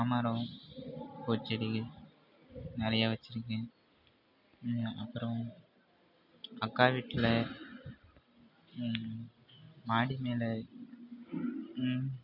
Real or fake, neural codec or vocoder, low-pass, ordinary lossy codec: real; none; 5.4 kHz; none